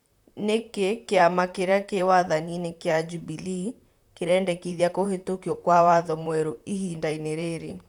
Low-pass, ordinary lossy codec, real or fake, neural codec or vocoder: 19.8 kHz; none; fake; vocoder, 44.1 kHz, 128 mel bands, Pupu-Vocoder